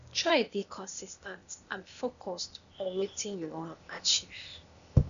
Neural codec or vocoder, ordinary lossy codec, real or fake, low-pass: codec, 16 kHz, 0.8 kbps, ZipCodec; none; fake; 7.2 kHz